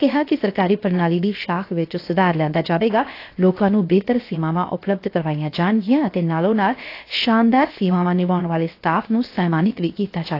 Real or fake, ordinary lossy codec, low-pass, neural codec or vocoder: fake; AAC, 32 kbps; 5.4 kHz; codec, 16 kHz, 0.7 kbps, FocalCodec